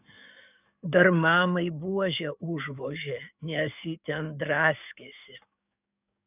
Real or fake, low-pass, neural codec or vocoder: fake; 3.6 kHz; codec, 44.1 kHz, 7.8 kbps, DAC